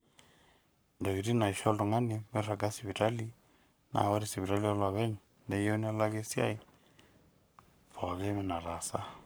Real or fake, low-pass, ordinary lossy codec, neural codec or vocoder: fake; none; none; codec, 44.1 kHz, 7.8 kbps, Pupu-Codec